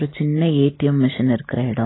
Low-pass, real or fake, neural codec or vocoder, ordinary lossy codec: 7.2 kHz; real; none; AAC, 16 kbps